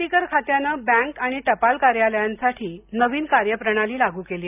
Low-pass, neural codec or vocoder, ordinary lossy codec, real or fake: 3.6 kHz; none; none; real